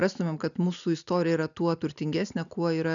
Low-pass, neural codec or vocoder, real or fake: 7.2 kHz; none; real